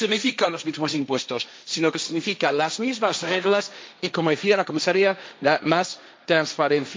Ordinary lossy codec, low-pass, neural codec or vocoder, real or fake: none; none; codec, 16 kHz, 1.1 kbps, Voila-Tokenizer; fake